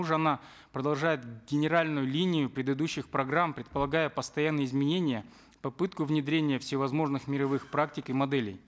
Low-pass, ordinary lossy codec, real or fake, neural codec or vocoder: none; none; real; none